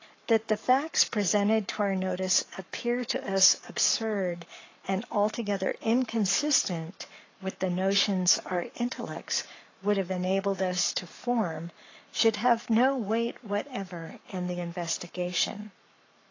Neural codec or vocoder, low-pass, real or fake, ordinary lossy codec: codec, 44.1 kHz, 7.8 kbps, Pupu-Codec; 7.2 kHz; fake; AAC, 32 kbps